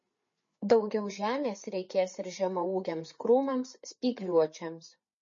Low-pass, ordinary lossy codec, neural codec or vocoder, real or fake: 7.2 kHz; MP3, 32 kbps; codec, 16 kHz, 4 kbps, FreqCodec, larger model; fake